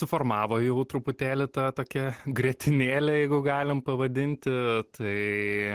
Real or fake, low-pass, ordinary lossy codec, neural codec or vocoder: real; 14.4 kHz; Opus, 16 kbps; none